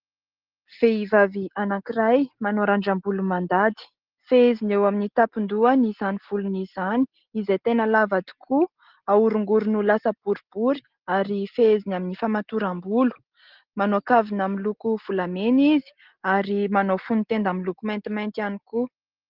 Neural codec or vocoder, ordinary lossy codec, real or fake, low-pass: none; Opus, 16 kbps; real; 5.4 kHz